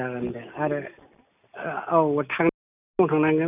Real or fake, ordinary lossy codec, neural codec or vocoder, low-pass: real; none; none; 3.6 kHz